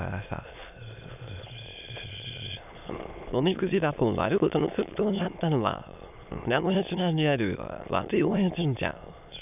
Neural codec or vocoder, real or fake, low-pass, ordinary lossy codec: autoencoder, 22.05 kHz, a latent of 192 numbers a frame, VITS, trained on many speakers; fake; 3.6 kHz; none